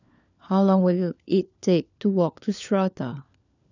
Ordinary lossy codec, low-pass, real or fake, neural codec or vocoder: none; 7.2 kHz; fake; codec, 16 kHz, 4 kbps, FunCodec, trained on LibriTTS, 50 frames a second